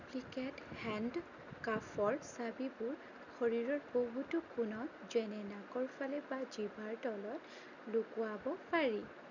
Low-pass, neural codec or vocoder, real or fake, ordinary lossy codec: 7.2 kHz; none; real; none